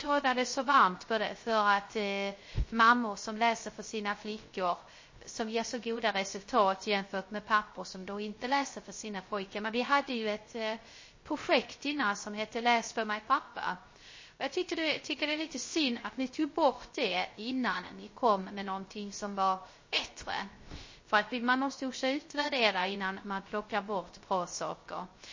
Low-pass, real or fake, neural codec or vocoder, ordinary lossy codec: 7.2 kHz; fake; codec, 16 kHz, 0.3 kbps, FocalCodec; MP3, 32 kbps